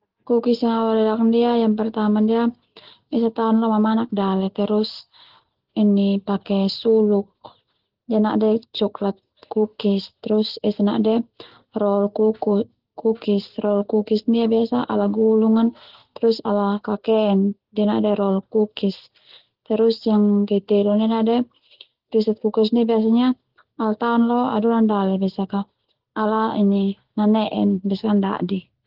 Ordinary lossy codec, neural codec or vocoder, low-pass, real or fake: Opus, 16 kbps; none; 5.4 kHz; real